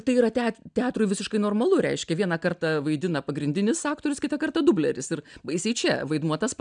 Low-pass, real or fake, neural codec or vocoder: 9.9 kHz; real; none